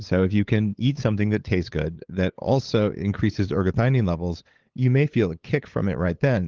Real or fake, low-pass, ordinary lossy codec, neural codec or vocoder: fake; 7.2 kHz; Opus, 16 kbps; codec, 16 kHz, 8 kbps, FunCodec, trained on LibriTTS, 25 frames a second